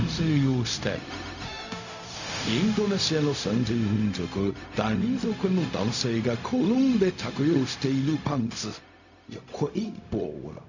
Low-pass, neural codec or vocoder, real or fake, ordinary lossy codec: 7.2 kHz; codec, 16 kHz, 0.4 kbps, LongCat-Audio-Codec; fake; none